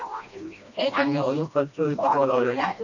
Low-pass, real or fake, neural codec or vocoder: 7.2 kHz; fake; codec, 16 kHz, 1 kbps, FreqCodec, smaller model